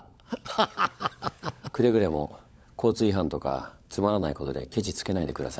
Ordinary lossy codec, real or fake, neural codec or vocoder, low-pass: none; fake; codec, 16 kHz, 16 kbps, FunCodec, trained on LibriTTS, 50 frames a second; none